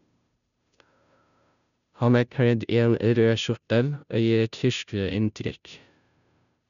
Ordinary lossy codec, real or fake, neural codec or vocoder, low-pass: none; fake; codec, 16 kHz, 0.5 kbps, FunCodec, trained on Chinese and English, 25 frames a second; 7.2 kHz